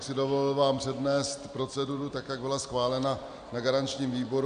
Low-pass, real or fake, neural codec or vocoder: 9.9 kHz; real; none